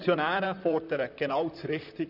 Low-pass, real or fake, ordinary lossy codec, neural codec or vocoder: 5.4 kHz; fake; none; vocoder, 44.1 kHz, 128 mel bands, Pupu-Vocoder